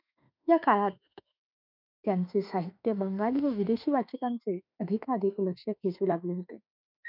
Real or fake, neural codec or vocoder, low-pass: fake; autoencoder, 48 kHz, 32 numbers a frame, DAC-VAE, trained on Japanese speech; 5.4 kHz